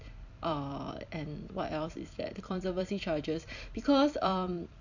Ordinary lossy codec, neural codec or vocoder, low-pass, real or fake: none; vocoder, 44.1 kHz, 128 mel bands every 256 samples, BigVGAN v2; 7.2 kHz; fake